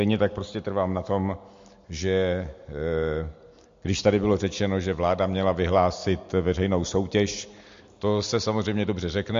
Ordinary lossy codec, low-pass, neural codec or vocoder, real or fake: MP3, 48 kbps; 7.2 kHz; none; real